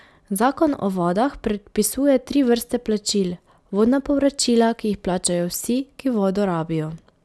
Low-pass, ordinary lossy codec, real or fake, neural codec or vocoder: none; none; real; none